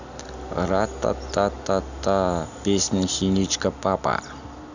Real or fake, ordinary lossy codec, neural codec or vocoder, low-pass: real; none; none; 7.2 kHz